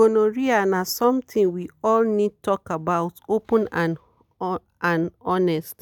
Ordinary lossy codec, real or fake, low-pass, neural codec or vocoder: none; real; 19.8 kHz; none